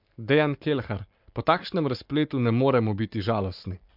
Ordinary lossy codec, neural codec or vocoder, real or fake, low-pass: MP3, 48 kbps; codec, 44.1 kHz, 7.8 kbps, Pupu-Codec; fake; 5.4 kHz